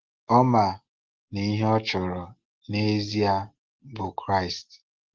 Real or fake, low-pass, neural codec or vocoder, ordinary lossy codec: real; 7.2 kHz; none; Opus, 16 kbps